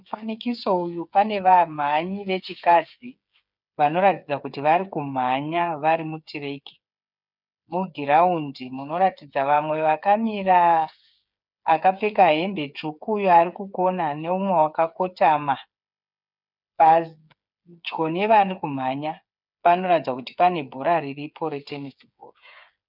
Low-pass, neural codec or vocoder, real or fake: 5.4 kHz; codec, 16 kHz, 8 kbps, FreqCodec, smaller model; fake